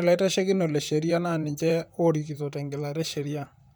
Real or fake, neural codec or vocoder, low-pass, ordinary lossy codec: fake; vocoder, 44.1 kHz, 128 mel bands, Pupu-Vocoder; none; none